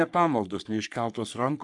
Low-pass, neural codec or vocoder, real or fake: 10.8 kHz; codec, 44.1 kHz, 3.4 kbps, Pupu-Codec; fake